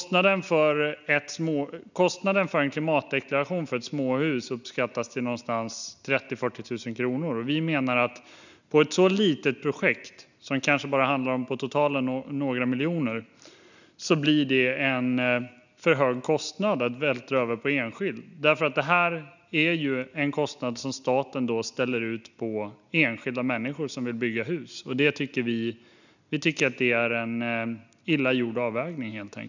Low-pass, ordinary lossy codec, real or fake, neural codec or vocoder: 7.2 kHz; none; real; none